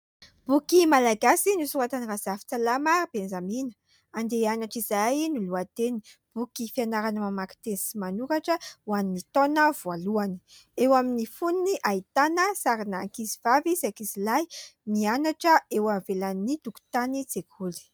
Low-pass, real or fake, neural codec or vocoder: 19.8 kHz; real; none